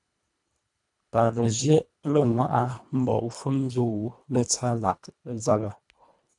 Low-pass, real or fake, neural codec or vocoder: 10.8 kHz; fake; codec, 24 kHz, 1.5 kbps, HILCodec